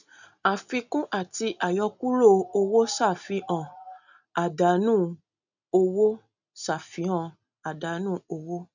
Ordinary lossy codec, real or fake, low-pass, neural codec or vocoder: none; real; 7.2 kHz; none